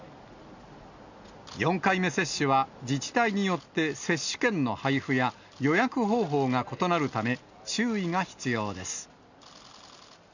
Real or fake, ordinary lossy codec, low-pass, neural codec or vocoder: real; none; 7.2 kHz; none